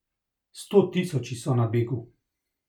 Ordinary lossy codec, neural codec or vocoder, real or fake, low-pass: none; none; real; 19.8 kHz